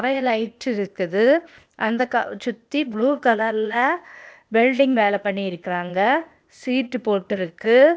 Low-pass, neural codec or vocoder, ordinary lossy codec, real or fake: none; codec, 16 kHz, 0.8 kbps, ZipCodec; none; fake